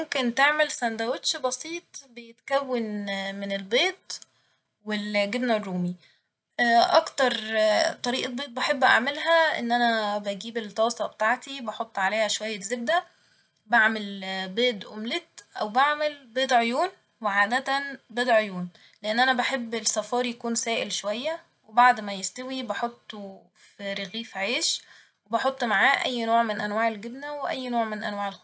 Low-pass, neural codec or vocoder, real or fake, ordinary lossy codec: none; none; real; none